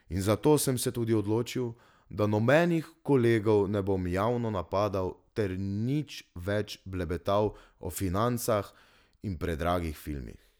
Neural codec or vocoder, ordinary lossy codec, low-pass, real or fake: none; none; none; real